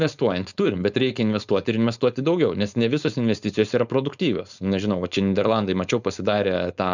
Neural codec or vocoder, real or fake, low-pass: codec, 16 kHz, 4.8 kbps, FACodec; fake; 7.2 kHz